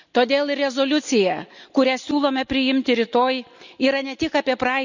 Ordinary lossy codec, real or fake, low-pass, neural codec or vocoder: none; real; 7.2 kHz; none